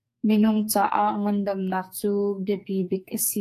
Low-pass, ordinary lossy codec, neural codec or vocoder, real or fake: 14.4 kHz; AAC, 64 kbps; codec, 44.1 kHz, 2.6 kbps, SNAC; fake